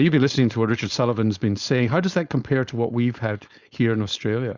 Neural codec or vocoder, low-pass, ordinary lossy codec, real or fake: codec, 16 kHz, 4.8 kbps, FACodec; 7.2 kHz; Opus, 64 kbps; fake